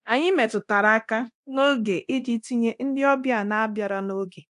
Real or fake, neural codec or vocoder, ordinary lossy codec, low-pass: fake; codec, 24 kHz, 0.9 kbps, DualCodec; AAC, 64 kbps; 10.8 kHz